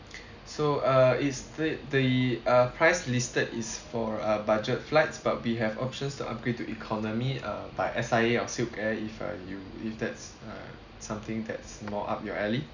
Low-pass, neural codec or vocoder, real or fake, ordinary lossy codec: 7.2 kHz; none; real; none